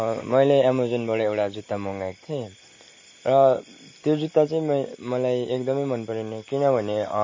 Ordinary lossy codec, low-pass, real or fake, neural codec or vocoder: MP3, 32 kbps; 7.2 kHz; real; none